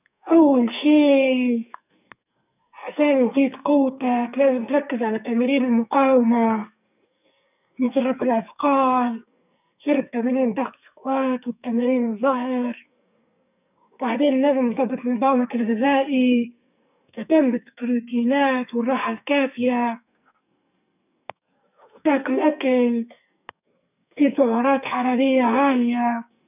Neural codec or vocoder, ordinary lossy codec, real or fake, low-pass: codec, 44.1 kHz, 2.6 kbps, SNAC; none; fake; 3.6 kHz